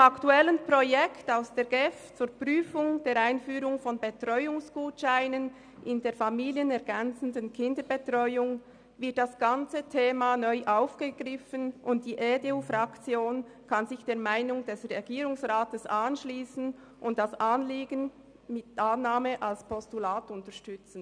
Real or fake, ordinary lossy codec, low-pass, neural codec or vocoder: real; none; 9.9 kHz; none